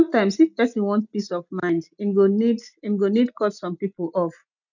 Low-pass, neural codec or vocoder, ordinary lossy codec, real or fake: 7.2 kHz; none; none; real